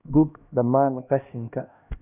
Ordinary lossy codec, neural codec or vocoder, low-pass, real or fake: none; codec, 16 kHz, 1 kbps, X-Codec, HuBERT features, trained on LibriSpeech; 3.6 kHz; fake